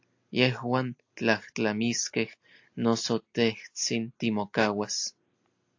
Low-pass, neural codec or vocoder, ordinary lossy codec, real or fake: 7.2 kHz; none; AAC, 48 kbps; real